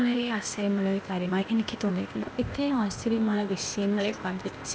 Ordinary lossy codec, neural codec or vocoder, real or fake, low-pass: none; codec, 16 kHz, 0.8 kbps, ZipCodec; fake; none